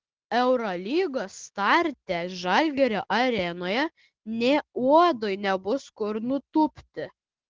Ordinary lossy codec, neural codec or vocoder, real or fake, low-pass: Opus, 16 kbps; none; real; 7.2 kHz